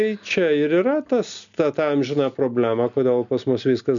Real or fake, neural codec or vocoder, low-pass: real; none; 7.2 kHz